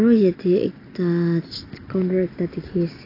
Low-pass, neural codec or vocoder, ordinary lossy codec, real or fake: 5.4 kHz; none; AAC, 24 kbps; real